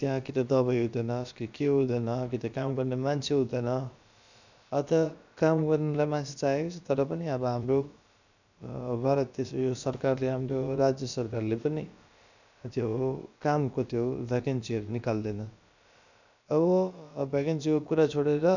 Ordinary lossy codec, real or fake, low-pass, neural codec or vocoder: none; fake; 7.2 kHz; codec, 16 kHz, about 1 kbps, DyCAST, with the encoder's durations